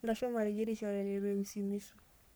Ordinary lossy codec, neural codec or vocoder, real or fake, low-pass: none; codec, 44.1 kHz, 3.4 kbps, Pupu-Codec; fake; none